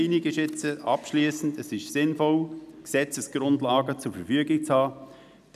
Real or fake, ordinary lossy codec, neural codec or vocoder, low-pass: real; none; none; 14.4 kHz